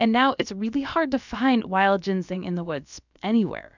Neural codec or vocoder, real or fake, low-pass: codec, 16 kHz, about 1 kbps, DyCAST, with the encoder's durations; fake; 7.2 kHz